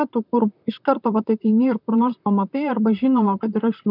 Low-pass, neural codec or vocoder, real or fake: 5.4 kHz; vocoder, 44.1 kHz, 128 mel bands, Pupu-Vocoder; fake